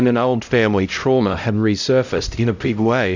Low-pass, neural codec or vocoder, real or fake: 7.2 kHz; codec, 16 kHz, 0.5 kbps, X-Codec, HuBERT features, trained on LibriSpeech; fake